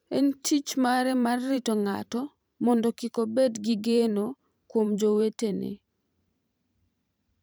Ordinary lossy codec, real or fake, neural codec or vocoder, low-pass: none; fake; vocoder, 44.1 kHz, 128 mel bands every 512 samples, BigVGAN v2; none